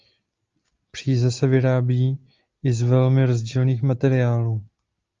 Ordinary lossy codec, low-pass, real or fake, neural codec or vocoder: Opus, 32 kbps; 7.2 kHz; real; none